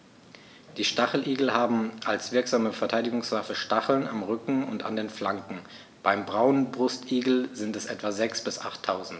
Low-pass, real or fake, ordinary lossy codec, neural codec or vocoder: none; real; none; none